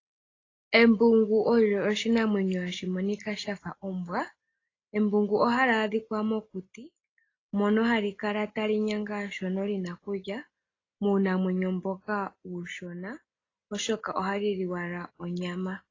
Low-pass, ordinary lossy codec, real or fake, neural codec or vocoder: 7.2 kHz; AAC, 32 kbps; real; none